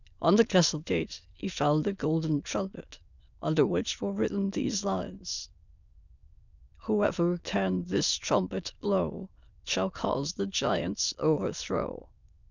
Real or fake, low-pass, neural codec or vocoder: fake; 7.2 kHz; autoencoder, 22.05 kHz, a latent of 192 numbers a frame, VITS, trained on many speakers